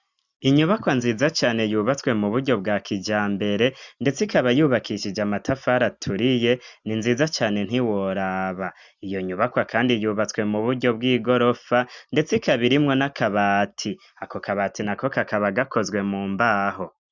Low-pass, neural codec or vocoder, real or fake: 7.2 kHz; none; real